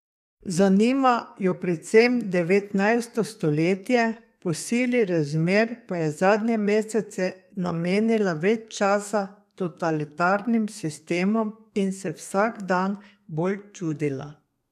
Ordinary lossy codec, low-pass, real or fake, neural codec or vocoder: none; 14.4 kHz; fake; codec, 32 kHz, 1.9 kbps, SNAC